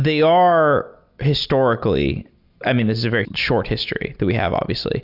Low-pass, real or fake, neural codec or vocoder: 5.4 kHz; real; none